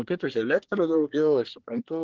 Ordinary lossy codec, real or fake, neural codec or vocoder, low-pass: Opus, 16 kbps; fake; codec, 24 kHz, 1 kbps, SNAC; 7.2 kHz